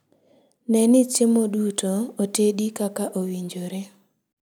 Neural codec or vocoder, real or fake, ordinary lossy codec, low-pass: none; real; none; none